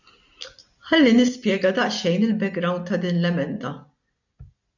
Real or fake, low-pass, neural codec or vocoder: real; 7.2 kHz; none